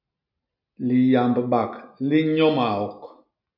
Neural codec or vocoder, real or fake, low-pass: none; real; 5.4 kHz